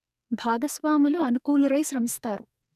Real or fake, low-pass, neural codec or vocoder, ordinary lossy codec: fake; 14.4 kHz; codec, 44.1 kHz, 2.6 kbps, SNAC; none